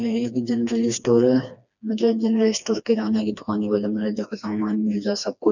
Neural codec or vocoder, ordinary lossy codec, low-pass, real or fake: codec, 16 kHz, 2 kbps, FreqCodec, smaller model; none; 7.2 kHz; fake